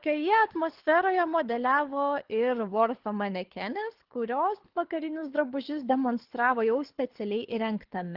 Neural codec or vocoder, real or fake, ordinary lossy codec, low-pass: codec, 24 kHz, 6 kbps, HILCodec; fake; Opus, 16 kbps; 5.4 kHz